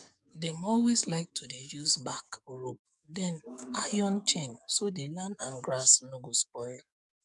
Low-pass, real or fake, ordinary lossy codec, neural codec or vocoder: 10.8 kHz; fake; none; codec, 44.1 kHz, 7.8 kbps, DAC